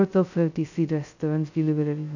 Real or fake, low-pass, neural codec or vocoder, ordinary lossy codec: fake; 7.2 kHz; codec, 16 kHz, 0.2 kbps, FocalCodec; none